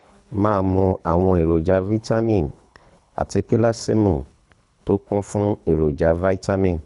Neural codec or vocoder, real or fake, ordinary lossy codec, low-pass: codec, 24 kHz, 3 kbps, HILCodec; fake; none; 10.8 kHz